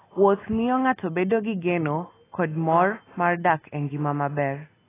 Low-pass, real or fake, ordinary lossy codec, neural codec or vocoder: 3.6 kHz; real; AAC, 16 kbps; none